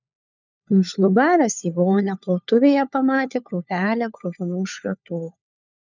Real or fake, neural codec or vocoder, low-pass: fake; codec, 16 kHz, 4 kbps, FunCodec, trained on LibriTTS, 50 frames a second; 7.2 kHz